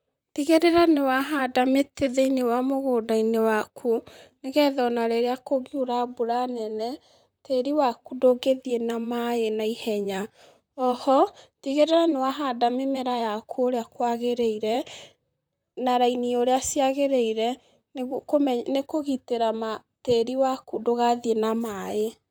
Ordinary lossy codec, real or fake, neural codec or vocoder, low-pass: none; fake; vocoder, 44.1 kHz, 128 mel bands, Pupu-Vocoder; none